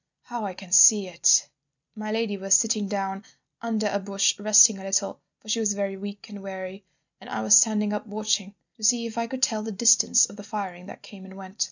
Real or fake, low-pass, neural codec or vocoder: real; 7.2 kHz; none